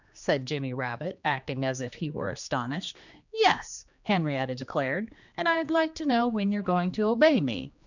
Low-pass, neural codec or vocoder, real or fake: 7.2 kHz; codec, 16 kHz, 2 kbps, X-Codec, HuBERT features, trained on general audio; fake